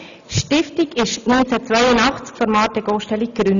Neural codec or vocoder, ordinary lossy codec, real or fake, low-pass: none; none; real; 7.2 kHz